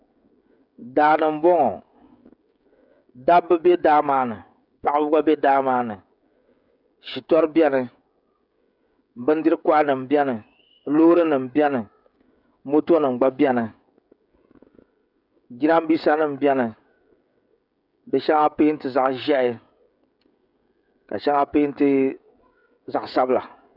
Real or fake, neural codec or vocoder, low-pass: fake; codec, 16 kHz, 16 kbps, FreqCodec, smaller model; 5.4 kHz